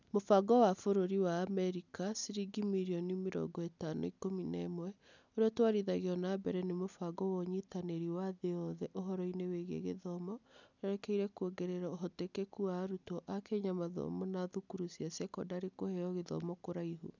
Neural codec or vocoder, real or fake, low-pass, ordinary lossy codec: none; real; 7.2 kHz; none